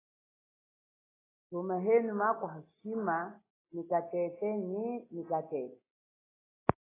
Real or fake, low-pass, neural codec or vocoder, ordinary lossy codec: real; 3.6 kHz; none; AAC, 16 kbps